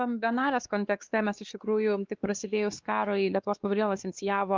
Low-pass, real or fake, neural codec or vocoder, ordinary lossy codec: 7.2 kHz; fake; codec, 16 kHz, 2 kbps, X-Codec, WavLM features, trained on Multilingual LibriSpeech; Opus, 32 kbps